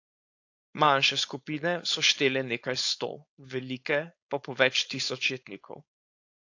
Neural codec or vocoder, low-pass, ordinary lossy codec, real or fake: codec, 16 kHz, 4.8 kbps, FACodec; 7.2 kHz; AAC, 48 kbps; fake